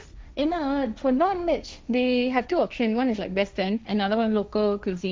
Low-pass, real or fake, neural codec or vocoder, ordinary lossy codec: none; fake; codec, 16 kHz, 1.1 kbps, Voila-Tokenizer; none